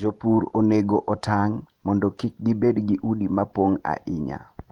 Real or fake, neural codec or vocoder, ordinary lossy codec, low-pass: fake; vocoder, 48 kHz, 128 mel bands, Vocos; Opus, 32 kbps; 19.8 kHz